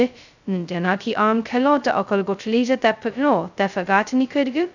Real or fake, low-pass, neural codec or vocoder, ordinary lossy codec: fake; 7.2 kHz; codec, 16 kHz, 0.2 kbps, FocalCodec; none